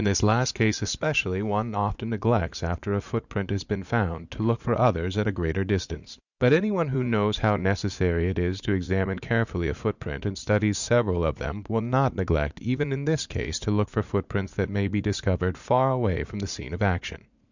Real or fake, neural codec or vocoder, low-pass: fake; vocoder, 44.1 kHz, 80 mel bands, Vocos; 7.2 kHz